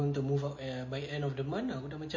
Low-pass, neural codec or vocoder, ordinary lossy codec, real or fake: 7.2 kHz; none; MP3, 32 kbps; real